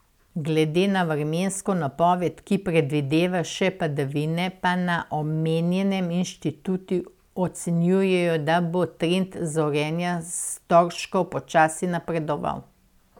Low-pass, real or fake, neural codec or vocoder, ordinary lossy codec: 19.8 kHz; real; none; none